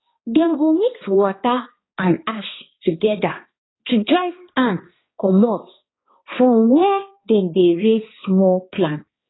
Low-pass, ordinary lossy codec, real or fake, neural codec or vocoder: 7.2 kHz; AAC, 16 kbps; fake; codec, 16 kHz, 2 kbps, X-Codec, HuBERT features, trained on balanced general audio